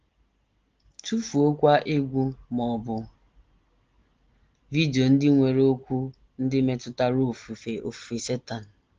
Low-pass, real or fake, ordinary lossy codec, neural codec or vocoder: 7.2 kHz; real; Opus, 16 kbps; none